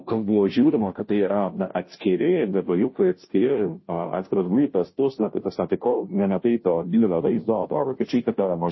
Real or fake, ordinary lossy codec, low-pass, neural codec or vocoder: fake; MP3, 24 kbps; 7.2 kHz; codec, 16 kHz, 0.5 kbps, FunCodec, trained on Chinese and English, 25 frames a second